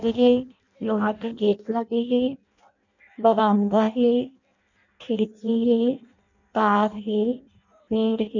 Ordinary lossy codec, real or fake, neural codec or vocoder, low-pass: none; fake; codec, 16 kHz in and 24 kHz out, 0.6 kbps, FireRedTTS-2 codec; 7.2 kHz